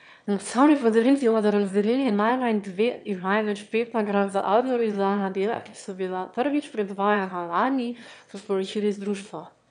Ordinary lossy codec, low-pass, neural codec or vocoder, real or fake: none; 9.9 kHz; autoencoder, 22.05 kHz, a latent of 192 numbers a frame, VITS, trained on one speaker; fake